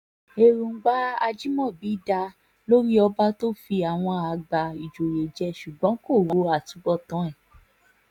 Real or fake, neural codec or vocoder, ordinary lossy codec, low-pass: real; none; none; 19.8 kHz